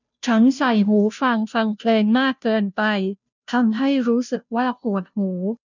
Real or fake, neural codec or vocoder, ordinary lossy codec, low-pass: fake; codec, 16 kHz, 0.5 kbps, FunCodec, trained on Chinese and English, 25 frames a second; none; 7.2 kHz